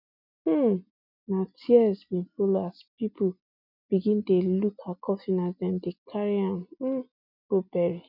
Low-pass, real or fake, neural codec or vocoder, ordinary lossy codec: 5.4 kHz; real; none; none